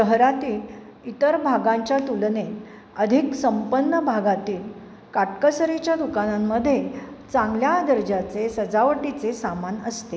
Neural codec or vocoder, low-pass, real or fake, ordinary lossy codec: none; none; real; none